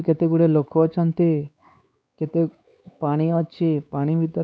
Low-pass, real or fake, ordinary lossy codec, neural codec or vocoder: none; fake; none; codec, 16 kHz, 4 kbps, X-Codec, WavLM features, trained on Multilingual LibriSpeech